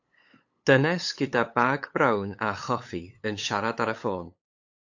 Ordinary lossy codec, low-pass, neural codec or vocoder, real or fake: AAC, 48 kbps; 7.2 kHz; codec, 16 kHz, 8 kbps, FunCodec, trained on LibriTTS, 25 frames a second; fake